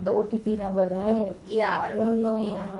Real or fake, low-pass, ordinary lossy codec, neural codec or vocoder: fake; 10.8 kHz; none; codec, 24 kHz, 1.5 kbps, HILCodec